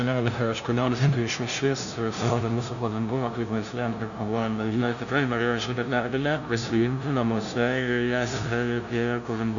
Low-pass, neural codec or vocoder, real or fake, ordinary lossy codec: 7.2 kHz; codec, 16 kHz, 0.5 kbps, FunCodec, trained on LibriTTS, 25 frames a second; fake; MP3, 96 kbps